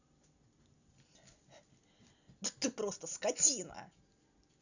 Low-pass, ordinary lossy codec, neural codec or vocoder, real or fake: 7.2 kHz; none; none; real